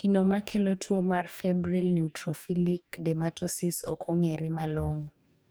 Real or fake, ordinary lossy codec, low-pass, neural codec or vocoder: fake; none; none; codec, 44.1 kHz, 2.6 kbps, DAC